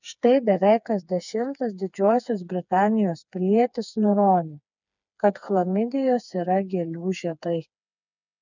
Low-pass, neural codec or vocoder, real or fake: 7.2 kHz; codec, 16 kHz, 4 kbps, FreqCodec, smaller model; fake